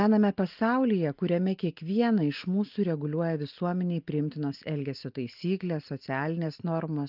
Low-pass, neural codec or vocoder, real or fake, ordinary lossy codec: 5.4 kHz; none; real; Opus, 32 kbps